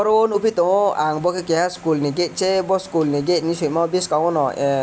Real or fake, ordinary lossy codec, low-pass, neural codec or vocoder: real; none; none; none